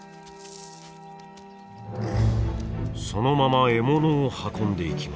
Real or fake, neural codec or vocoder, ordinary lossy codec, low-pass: real; none; none; none